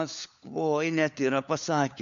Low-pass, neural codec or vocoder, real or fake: 7.2 kHz; codec, 16 kHz, 4 kbps, FunCodec, trained on LibriTTS, 50 frames a second; fake